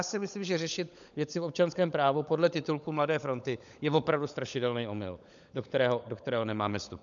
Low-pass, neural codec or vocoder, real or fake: 7.2 kHz; codec, 16 kHz, 16 kbps, FunCodec, trained on LibriTTS, 50 frames a second; fake